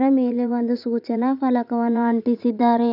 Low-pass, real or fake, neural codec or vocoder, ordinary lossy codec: 5.4 kHz; fake; vocoder, 44.1 kHz, 80 mel bands, Vocos; none